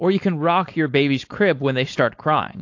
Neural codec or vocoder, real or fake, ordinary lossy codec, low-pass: codec, 16 kHz, 4.8 kbps, FACodec; fake; AAC, 48 kbps; 7.2 kHz